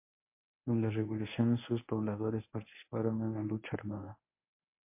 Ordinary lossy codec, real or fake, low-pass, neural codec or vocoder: MP3, 24 kbps; fake; 3.6 kHz; codec, 24 kHz, 0.9 kbps, WavTokenizer, medium speech release version 1